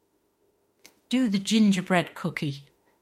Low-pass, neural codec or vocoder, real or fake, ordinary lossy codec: 19.8 kHz; autoencoder, 48 kHz, 32 numbers a frame, DAC-VAE, trained on Japanese speech; fake; MP3, 64 kbps